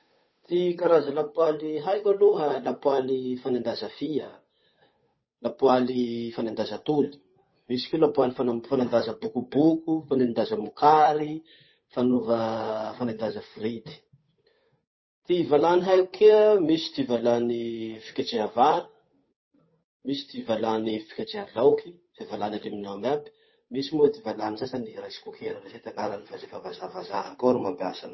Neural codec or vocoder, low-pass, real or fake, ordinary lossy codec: codec, 16 kHz, 8 kbps, FunCodec, trained on Chinese and English, 25 frames a second; 7.2 kHz; fake; MP3, 24 kbps